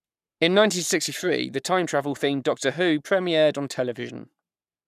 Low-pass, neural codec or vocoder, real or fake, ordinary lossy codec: 14.4 kHz; codec, 44.1 kHz, 7.8 kbps, Pupu-Codec; fake; none